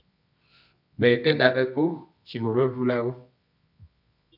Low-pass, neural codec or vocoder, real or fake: 5.4 kHz; codec, 24 kHz, 0.9 kbps, WavTokenizer, medium music audio release; fake